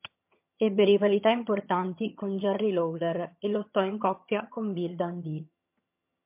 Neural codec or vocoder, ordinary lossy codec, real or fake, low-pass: vocoder, 22.05 kHz, 80 mel bands, HiFi-GAN; MP3, 32 kbps; fake; 3.6 kHz